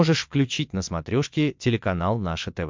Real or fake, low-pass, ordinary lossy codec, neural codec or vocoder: fake; 7.2 kHz; MP3, 64 kbps; vocoder, 22.05 kHz, 80 mel bands, WaveNeXt